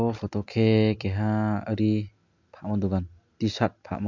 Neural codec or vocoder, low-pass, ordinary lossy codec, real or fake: none; 7.2 kHz; MP3, 64 kbps; real